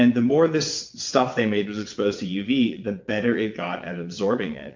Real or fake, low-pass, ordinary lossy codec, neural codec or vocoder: fake; 7.2 kHz; MP3, 48 kbps; vocoder, 22.05 kHz, 80 mel bands, WaveNeXt